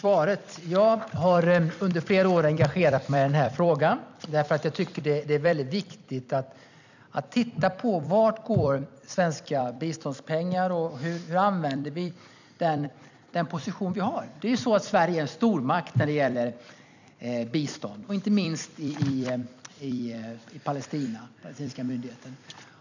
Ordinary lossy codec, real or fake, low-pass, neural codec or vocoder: none; fake; 7.2 kHz; vocoder, 44.1 kHz, 128 mel bands every 256 samples, BigVGAN v2